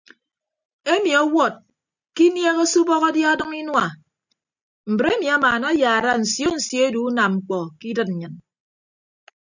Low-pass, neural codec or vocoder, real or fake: 7.2 kHz; none; real